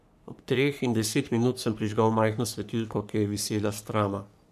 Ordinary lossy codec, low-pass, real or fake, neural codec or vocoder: none; 14.4 kHz; fake; codec, 44.1 kHz, 3.4 kbps, Pupu-Codec